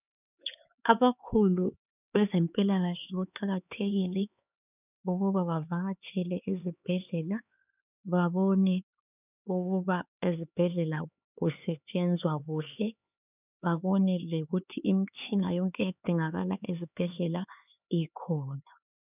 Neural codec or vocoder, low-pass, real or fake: codec, 16 kHz, 4 kbps, X-Codec, HuBERT features, trained on LibriSpeech; 3.6 kHz; fake